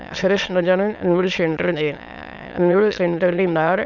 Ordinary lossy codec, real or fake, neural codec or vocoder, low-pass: none; fake; autoencoder, 22.05 kHz, a latent of 192 numbers a frame, VITS, trained on many speakers; 7.2 kHz